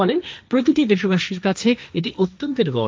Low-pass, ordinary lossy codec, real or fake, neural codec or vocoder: none; none; fake; codec, 16 kHz, 1.1 kbps, Voila-Tokenizer